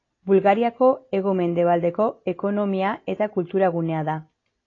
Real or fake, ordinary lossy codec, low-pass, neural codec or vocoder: real; AAC, 32 kbps; 7.2 kHz; none